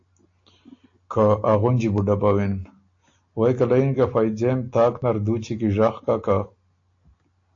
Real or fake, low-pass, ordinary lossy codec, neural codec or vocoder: real; 7.2 kHz; AAC, 48 kbps; none